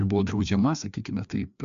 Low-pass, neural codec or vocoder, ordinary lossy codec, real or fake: 7.2 kHz; codec, 16 kHz, 2 kbps, FreqCodec, larger model; MP3, 64 kbps; fake